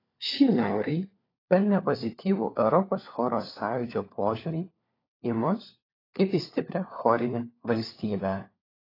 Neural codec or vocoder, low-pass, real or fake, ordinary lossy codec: codec, 16 kHz, 4 kbps, FunCodec, trained on LibriTTS, 50 frames a second; 5.4 kHz; fake; AAC, 24 kbps